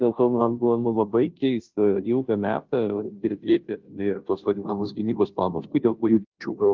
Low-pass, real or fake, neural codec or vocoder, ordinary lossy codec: 7.2 kHz; fake; codec, 16 kHz, 0.5 kbps, FunCodec, trained on Chinese and English, 25 frames a second; Opus, 32 kbps